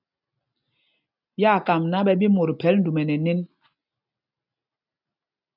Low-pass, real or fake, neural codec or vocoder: 5.4 kHz; real; none